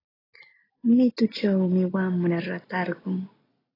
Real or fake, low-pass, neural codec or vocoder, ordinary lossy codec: real; 5.4 kHz; none; AAC, 24 kbps